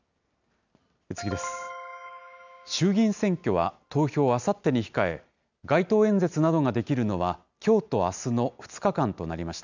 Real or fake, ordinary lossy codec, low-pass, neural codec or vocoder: real; none; 7.2 kHz; none